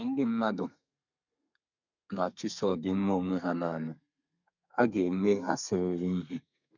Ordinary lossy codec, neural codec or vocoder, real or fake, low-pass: none; codec, 32 kHz, 1.9 kbps, SNAC; fake; 7.2 kHz